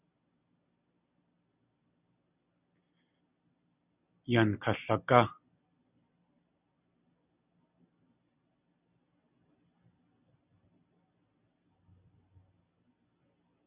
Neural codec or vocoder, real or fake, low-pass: none; real; 3.6 kHz